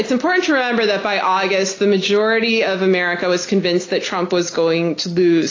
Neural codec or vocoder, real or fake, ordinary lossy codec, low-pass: none; real; AAC, 32 kbps; 7.2 kHz